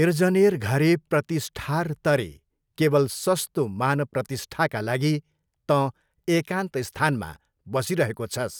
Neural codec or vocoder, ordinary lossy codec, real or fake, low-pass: none; none; real; none